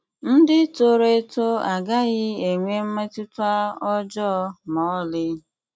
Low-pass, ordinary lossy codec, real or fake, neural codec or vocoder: none; none; real; none